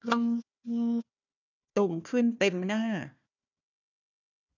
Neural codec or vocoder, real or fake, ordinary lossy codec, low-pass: codec, 16 kHz, 1 kbps, FunCodec, trained on Chinese and English, 50 frames a second; fake; none; 7.2 kHz